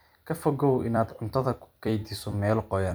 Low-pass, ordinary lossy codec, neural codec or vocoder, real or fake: none; none; vocoder, 44.1 kHz, 128 mel bands every 256 samples, BigVGAN v2; fake